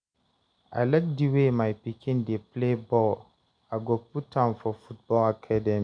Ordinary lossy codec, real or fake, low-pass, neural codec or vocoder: none; real; 9.9 kHz; none